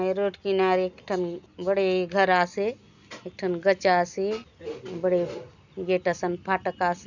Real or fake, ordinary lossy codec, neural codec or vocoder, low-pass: real; none; none; 7.2 kHz